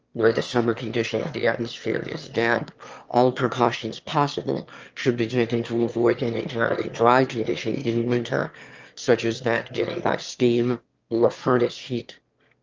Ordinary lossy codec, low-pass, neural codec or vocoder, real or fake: Opus, 24 kbps; 7.2 kHz; autoencoder, 22.05 kHz, a latent of 192 numbers a frame, VITS, trained on one speaker; fake